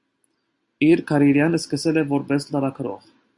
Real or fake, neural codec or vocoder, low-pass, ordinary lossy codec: real; none; 10.8 kHz; Opus, 64 kbps